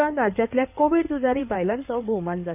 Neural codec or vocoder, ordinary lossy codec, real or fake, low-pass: codec, 16 kHz in and 24 kHz out, 2.2 kbps, FireRedTTS-2 codec; none; fake; 3.6 kHz